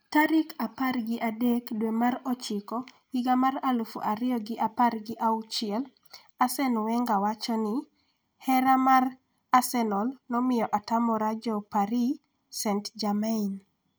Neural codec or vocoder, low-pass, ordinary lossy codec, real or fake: none; none; none; real